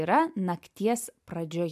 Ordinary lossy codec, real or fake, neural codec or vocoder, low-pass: MP3, 96 kbps; real; none; 14.4 kHz